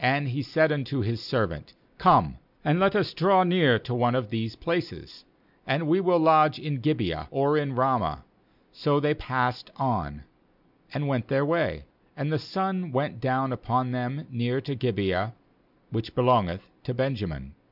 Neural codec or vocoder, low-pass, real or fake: none; 5.4 kHz; real